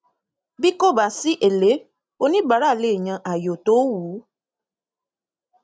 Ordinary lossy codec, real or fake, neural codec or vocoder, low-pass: none; real; none; none